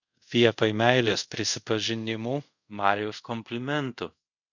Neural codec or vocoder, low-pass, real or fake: codec, 24 kHz, 0.5 kbps, DualCodec; 7.2 kHz; fake